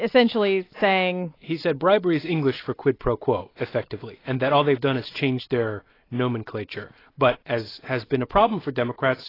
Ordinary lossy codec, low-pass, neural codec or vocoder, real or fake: AAC, 24 kbps; 5.4 kHz; none; real